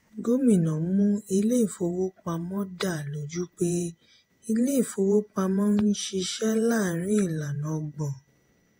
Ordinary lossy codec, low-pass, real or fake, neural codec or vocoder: AAC, 48 kbps; 19.8 kHz; fake; vocoder, 48 kHz, 128 mel bands, Vocos